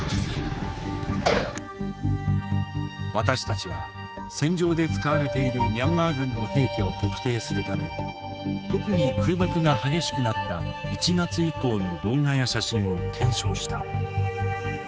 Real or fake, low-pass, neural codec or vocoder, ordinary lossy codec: fake; none; codec, 16 kHz, 4 kbps, X-Codec, HuBERT features, trained on general audio; none